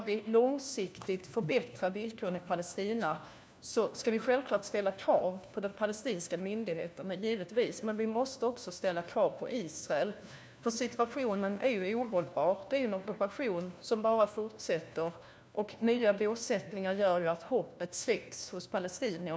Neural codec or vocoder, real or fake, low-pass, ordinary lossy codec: codec, 16 kHz, 1 kbps, FunCodec, trained on LibriTTS, 50 frames a second; fake; none; none